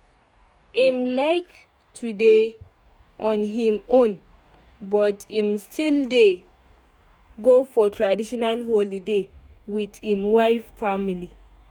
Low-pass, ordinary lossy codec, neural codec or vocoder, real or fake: 19.8 kHz; none; codec, 44.1 kHz, 2.6 kbps, DAC; fake